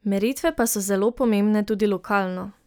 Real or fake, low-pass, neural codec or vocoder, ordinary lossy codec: real; none; none; none